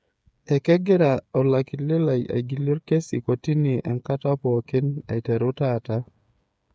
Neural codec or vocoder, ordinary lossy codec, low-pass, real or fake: codec, 16 kHz, 8 kbps, FreqCodec, smaller model; none; none; fake